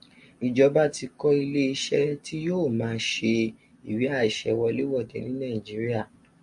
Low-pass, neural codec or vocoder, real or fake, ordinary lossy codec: 10.8 kHz; none; real; MP3, 64 kbps